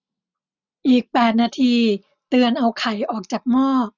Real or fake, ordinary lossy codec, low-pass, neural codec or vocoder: real; none; 7.2 kHz; none